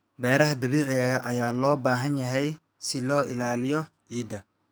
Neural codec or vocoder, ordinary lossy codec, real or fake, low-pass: codec, 44.1 kHz, 2.6 kbps, SNAC; none; fake; none